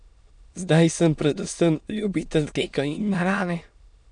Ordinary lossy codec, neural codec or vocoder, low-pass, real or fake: none; autoencoder, 22.05 kHz, a latent of 192 numbers a frame, VITS, trained on many speakers; 9.9 kHz; fake